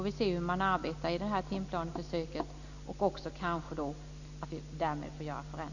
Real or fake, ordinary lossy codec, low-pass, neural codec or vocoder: real; none; 7.2 kHz; none